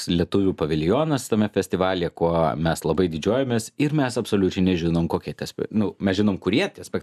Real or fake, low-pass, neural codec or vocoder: real; 14.4 kHz; none